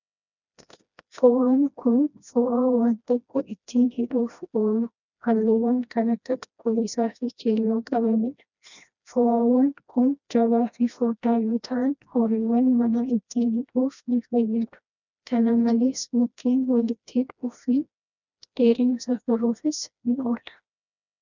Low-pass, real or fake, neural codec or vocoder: 7.2 kHz; fake; codec, 16 kHz, 1 kbps, FreqCodec, smaller model